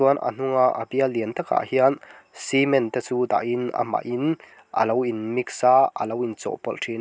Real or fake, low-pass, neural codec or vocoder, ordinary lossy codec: real; none; none; none